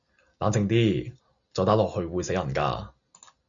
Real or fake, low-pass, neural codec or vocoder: real; 7.2 kHz; none